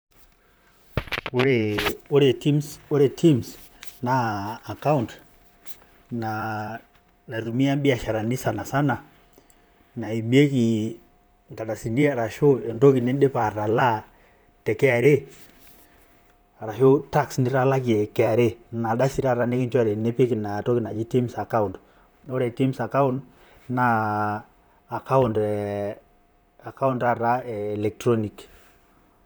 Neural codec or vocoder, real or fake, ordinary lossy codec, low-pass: vocoder, 44.1 kHz, 128 mel bands, Pupu-Vocoder; fake; none; none